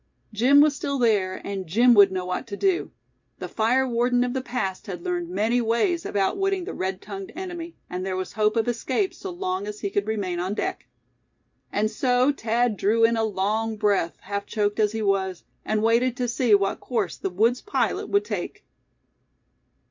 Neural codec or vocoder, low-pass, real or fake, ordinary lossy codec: none; 7.2 kHz; real; MP3, 64 kbps